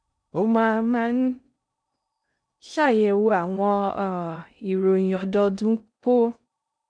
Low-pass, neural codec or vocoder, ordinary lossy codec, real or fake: 9.9 kHz; codec, 16 kHz in and 24 kHz out, 0.6 kbps, FocalCodec, streaming, 2048 codes; MP3, 96 kbps; fake